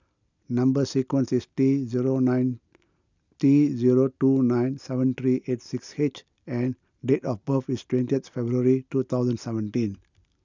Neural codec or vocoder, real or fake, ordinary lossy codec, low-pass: none; real; none; 7.2 kHz